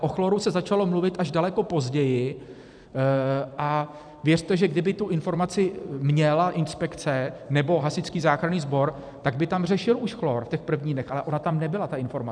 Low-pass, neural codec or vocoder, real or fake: 9.9 kHz; vocoder, 44.1 kHz, 128 mel bands every 256 samples, BigVGAN v2; fake